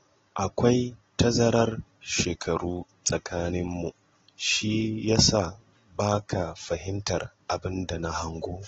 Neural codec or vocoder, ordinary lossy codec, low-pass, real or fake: none; AAC, 24 kbps; 7.2 kHz; real